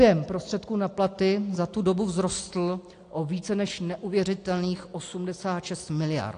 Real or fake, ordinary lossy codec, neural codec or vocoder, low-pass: real; AAC, 48 kbps; none; 9.9 kHz